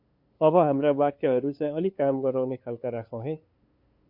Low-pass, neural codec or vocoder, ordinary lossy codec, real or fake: 5.4 kHz; codec, 16 kHz, 2 kbps, FunCodec, trained on LibriTTS, 25 frames a second; MP3, 48 kbps; fake